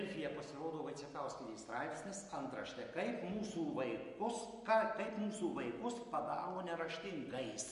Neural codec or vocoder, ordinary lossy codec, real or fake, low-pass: none; MP3, 48 kbps; real; 14.4 kHz